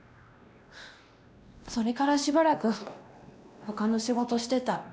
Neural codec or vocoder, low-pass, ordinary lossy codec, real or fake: codec, 16 kHz, 1 kbps, X-Codec, WavLM features, trained on Multilingual LibriSpeech; none; none; fake